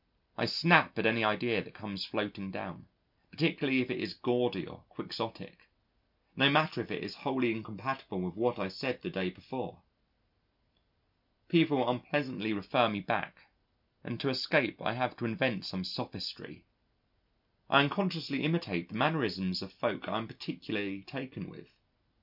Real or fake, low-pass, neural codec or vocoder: real; 5.4 kHz; none